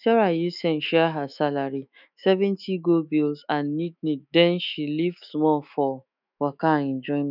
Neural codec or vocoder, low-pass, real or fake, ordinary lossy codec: autoencoder, 48 kHz, 128 numbers a frame, DAC-VAE, trained on Japanese speech; 5.4 kHz; fake; none